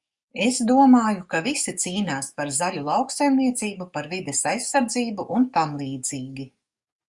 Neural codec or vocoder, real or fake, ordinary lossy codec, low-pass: codec, 44.1 kHz, 7.8 kbps, DAC; fake; Opus, 64 kbps; 10.8 kHz